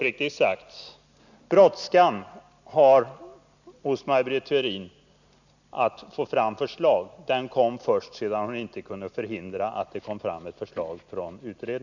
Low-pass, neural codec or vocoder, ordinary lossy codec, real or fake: 7.2 kHz; none; none; real